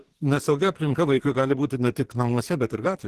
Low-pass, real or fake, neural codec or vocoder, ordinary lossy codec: 14.4 kHz; fake; codec, 44.1 kHz, 2.6 kbps, SNAC; Opus, 16 kbps